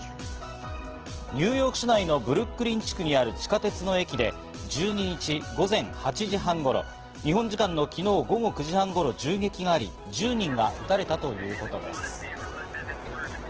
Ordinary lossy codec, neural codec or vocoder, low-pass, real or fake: Opus, 16 kbps; none; 7.2 kHz; real